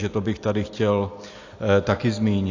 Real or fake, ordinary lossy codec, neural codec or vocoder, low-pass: real; AAC, 48 kbps; none; 7.2 kHz